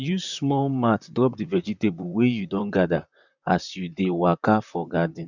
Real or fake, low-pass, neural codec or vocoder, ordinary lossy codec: fake; 7.2 kHz; vocoder, 44.1 kHz, 128 mel bands, Pupu-Vocoder; none